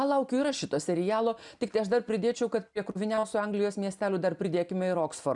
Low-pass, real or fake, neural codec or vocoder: 10.8 kHz; real; none